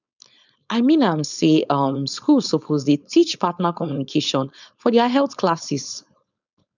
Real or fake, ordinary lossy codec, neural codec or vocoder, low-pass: fake; none; codec, 16 kHz, 4.8 kbps, FACodec; 7.2 kHz